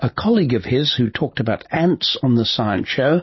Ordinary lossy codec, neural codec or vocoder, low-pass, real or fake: MP3, 24 kbps; none; 7.2 kHz; real